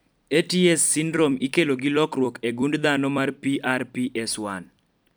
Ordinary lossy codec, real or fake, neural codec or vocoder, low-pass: none; fake; vocoder, 44.1 kHz, 128 mel bands every 256 samples, BigVGAN v2; none